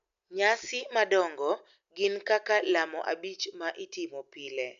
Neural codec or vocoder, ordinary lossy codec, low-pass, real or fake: none; AAC, 96 kbps; 7.2 kHz; real